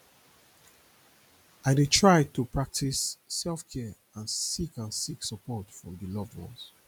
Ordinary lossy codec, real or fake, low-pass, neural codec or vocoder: none; real; none; none